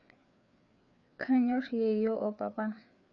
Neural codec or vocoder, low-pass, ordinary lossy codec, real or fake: codec, 16 kHz, 4 kbps, FreqCodec, larger model; 7.2 kHz; none; fake